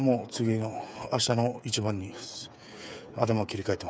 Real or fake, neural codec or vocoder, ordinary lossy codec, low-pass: fake; codec, 16 kHz, 8 kbps, FreqCodec, smaller model; none; none